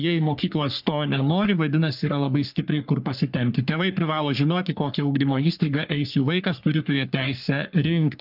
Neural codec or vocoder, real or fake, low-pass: codec, 44.1 kHz, 3.4 kbps, Pupu-Codec; fake; 5.4 kHz